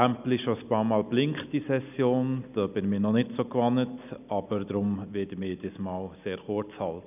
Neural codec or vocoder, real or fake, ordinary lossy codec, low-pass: none; real; none; 3.6 kHz